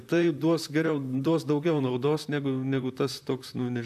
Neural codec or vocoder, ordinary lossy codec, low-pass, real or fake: vocoder, 44.1 kHz, 128 mel bands, Pupu-Vocoder; AAC, 96 kbps; 14.4 kHz; fake